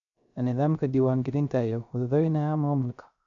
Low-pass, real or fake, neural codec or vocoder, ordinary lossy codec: 7.2 kHz; fake; codec, 16 kHz, 0.3 kbps, FocalCodec; none